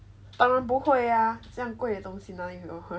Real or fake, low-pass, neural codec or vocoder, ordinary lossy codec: real; none; none; none